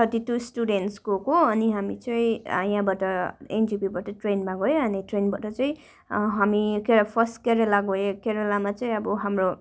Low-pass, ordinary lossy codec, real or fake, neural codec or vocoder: none; none; real; none